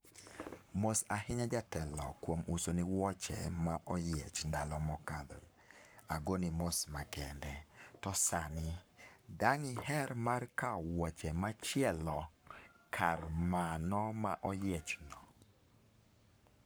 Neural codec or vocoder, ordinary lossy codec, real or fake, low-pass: codec, 44.1 kHz, 7.8 kbps, Pupu-Codec; none; fake; none